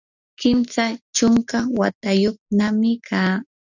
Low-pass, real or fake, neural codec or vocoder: 7.2 kHz; real; none